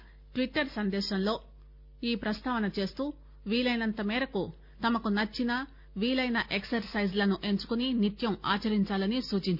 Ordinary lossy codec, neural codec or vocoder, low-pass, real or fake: none; none; 5.4 kHz; real